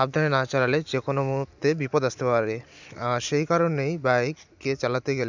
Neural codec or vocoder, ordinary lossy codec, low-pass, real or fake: none; none; 7.2 kHz; real